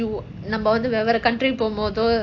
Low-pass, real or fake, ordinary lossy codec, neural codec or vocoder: 7.2 kHz; real; AAC, 48 kbps; none